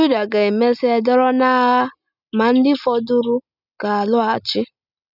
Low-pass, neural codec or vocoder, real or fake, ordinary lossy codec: 5.4 kHz; none; real; none